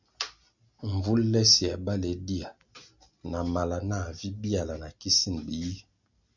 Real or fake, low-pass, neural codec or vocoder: real; 7.2 kHz; none